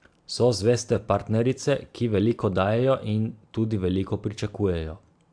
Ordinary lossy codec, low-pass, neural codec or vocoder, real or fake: AAC, 64 kbps; 9.9 kHz; vocoder, 44.1 kHz, 128 mel bands every 512 samples, BigVGAN v2; fake